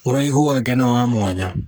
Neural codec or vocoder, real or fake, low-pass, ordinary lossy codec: codec, 44.1 kHz, 3.4 kbps, Pupu-Codec; fake; none; none